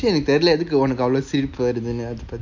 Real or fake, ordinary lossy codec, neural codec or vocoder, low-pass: real; MP3, 64 kbps; none; 7.2 kHz